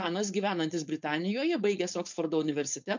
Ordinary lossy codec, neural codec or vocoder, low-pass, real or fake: MP3, 64 kbps; codec, 16 kHz, 4.8 kbps, FACodec; 7.2 kHz; fake